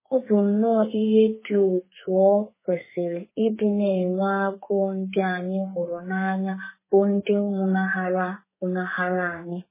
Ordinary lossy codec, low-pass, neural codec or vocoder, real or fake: MP3, 16 kbps; 3.6 kHz; codec, 32 kHz, 1.9 kbps, SNAC; fake